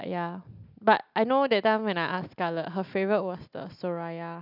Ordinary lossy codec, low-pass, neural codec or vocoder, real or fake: none; 5.4 kHz; none; real